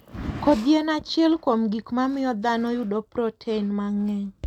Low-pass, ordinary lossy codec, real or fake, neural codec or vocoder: 19.8 kHz; none; real; none